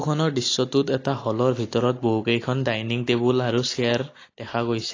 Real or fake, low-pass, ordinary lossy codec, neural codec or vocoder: real; 7.2 kHz; AAC, 32 kbps; none